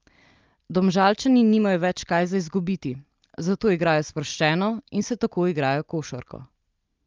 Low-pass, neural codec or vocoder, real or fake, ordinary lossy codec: 7.2 kHz; none; real; Opus, 32 kbps